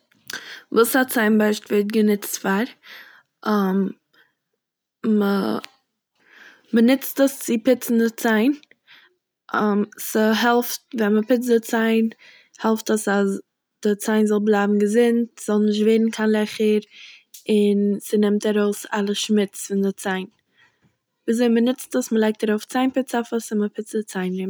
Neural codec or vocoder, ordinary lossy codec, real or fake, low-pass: none; none; real; none